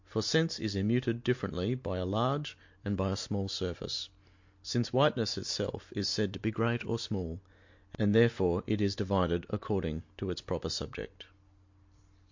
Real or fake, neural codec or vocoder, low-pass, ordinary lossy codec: real; none; 7.2 kHz; MP3, 48 kbps